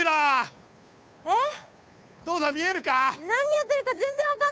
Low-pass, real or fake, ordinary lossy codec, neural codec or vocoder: none; fake; none; codec, 16 kHz, 2 kbps, FunCodec, trained on Chinese and English, 25 frames a second